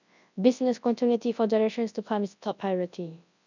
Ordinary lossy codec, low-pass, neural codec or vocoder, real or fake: none; 7.2 kHz; codec, 24 kHz, 0.9 kbps, WavTokenizer, large speech release; fake